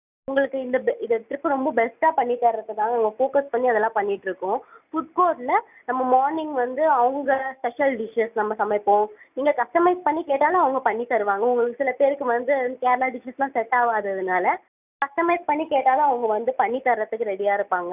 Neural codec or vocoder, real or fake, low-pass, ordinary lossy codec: none; real; 3.6 kHz; none